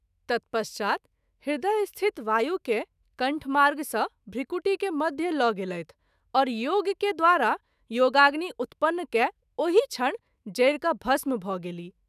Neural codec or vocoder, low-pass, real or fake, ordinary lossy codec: autoencoder, 48 kHz, 128 numbers a frame, DAC-VAE, trained on Japanese speech; 14.4 kHz; fake; none